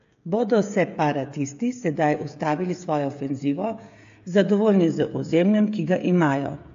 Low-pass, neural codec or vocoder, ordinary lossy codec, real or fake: 7.2 kHz; codec, 16 kHz, 16 kbps, FreqCodec, smaller model; AAC, 48 kbps; fake